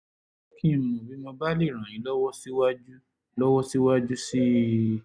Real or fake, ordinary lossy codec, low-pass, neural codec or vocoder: real; none; 9.9 kHz; none